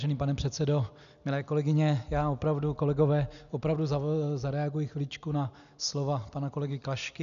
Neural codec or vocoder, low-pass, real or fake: none; 7.2 kHz; real